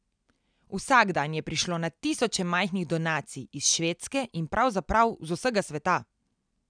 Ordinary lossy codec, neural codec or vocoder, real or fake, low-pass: none; none; real; 9.9 kHz